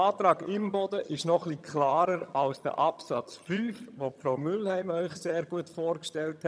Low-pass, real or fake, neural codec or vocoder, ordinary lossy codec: none; fake; vocoder, 22.05 kHz, 80 mel bands, HiFi-GAN; none